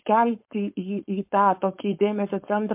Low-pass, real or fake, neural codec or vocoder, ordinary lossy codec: 3.6 kHz; fake; codec, 16 kHz, 4.8 kbps, FACodec; MP3, 32 kbps